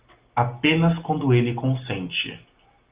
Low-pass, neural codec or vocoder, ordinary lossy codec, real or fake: 3.6 kHz; none; Opus, 32 kbps; real